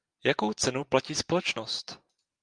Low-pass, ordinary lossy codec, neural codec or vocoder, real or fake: 9.9 kHz; Opus, 32 kbps; none; real